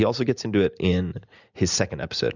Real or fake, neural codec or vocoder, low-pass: real; none; 7.2 kHz